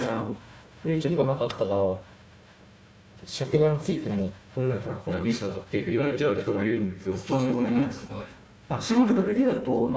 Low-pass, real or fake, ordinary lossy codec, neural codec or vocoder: none; fake; none; codec, 16 kHz, 1 kbps, FunCodec, trained on Chinese and English, 50 frames a second